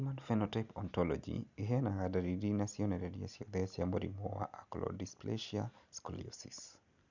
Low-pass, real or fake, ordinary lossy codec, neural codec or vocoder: 7.2 kHz; real; none; none